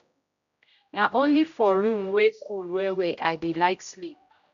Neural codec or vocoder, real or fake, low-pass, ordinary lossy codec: codec, 16 kHz, 0.5 kbps, X-Codec, HuBERT features, trained on general audio; fake; 7.2 kHz; none